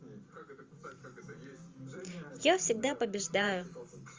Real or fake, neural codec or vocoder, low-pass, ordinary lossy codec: fake; vocoder, 44.1 kHz, 128 mel bands every 512 samples, BigVGAN v2; 7.2 kHz; Opus, 32 kbps